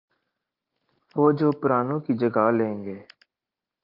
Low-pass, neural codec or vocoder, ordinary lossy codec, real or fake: 5.4 kHz; none; Opus, 24 kbps; real